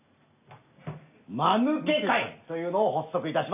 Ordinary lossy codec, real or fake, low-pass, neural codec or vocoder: AAC, 32 kbps; real; 3.6 kHz; none